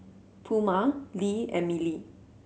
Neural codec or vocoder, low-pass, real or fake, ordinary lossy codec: none; none; real; none